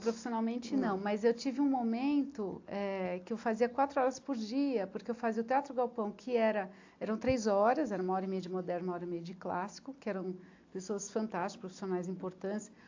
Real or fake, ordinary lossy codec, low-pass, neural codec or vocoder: real; AAC, 48 kbps; 7.2 kHz; none